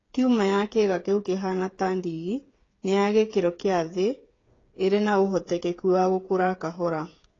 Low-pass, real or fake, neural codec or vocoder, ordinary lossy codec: 7.2 kHz; fake; codec, 16 kHz, 8 kbps, FreqCodec, smaller model; AAC, 32 kbps